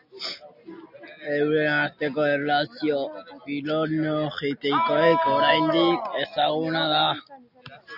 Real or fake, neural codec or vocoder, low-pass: real; none; 5.4 kHz